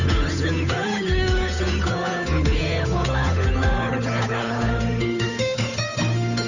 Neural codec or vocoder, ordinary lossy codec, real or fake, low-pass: codec, 16 kHz, 8 kbps, FunCodec, trained on Chinese and English, 25 frames a second; none; fake; 7.2 kHz